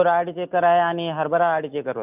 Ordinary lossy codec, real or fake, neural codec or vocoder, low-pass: none; real; none; 3.6 kHz